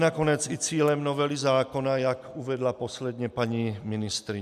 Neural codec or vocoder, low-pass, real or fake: none; 14.4 kHz; real